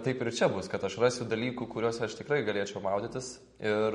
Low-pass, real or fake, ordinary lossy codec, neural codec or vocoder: 19.8 kHz; real; MP3, 48 kbps; none